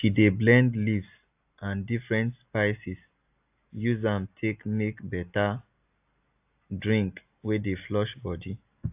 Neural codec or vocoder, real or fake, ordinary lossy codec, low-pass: none; real; AAC, 32 kbps; 3.6 kHz